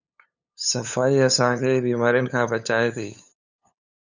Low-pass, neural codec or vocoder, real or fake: 7.2 kHz; codec, 16 kHz, 8 kbps, FunCodec, trained on LibriTTS, 25 frames a second; fake